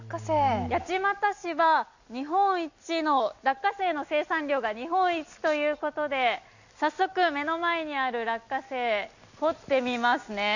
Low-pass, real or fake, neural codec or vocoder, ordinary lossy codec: 7.2 kHz; real; none; none